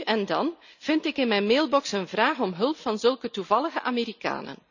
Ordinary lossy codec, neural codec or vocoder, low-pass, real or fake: none; none; 7.2 kHz; real